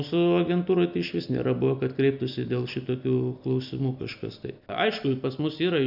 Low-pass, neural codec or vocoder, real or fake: 5.4 kHz; none; real